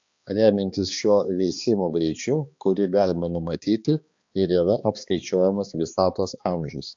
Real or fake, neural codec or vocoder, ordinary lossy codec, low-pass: fake; codec, 16 kHz, 2 kbps, X-Codec, HuBERT features, trained on balanced general audio; AAC, 64 kbps; 7.2 kHz